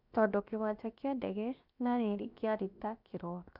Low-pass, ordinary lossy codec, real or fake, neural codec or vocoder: 5.4 kHz; none; fake; codec, 16 kHz, 0.7 kbps, FocalCodec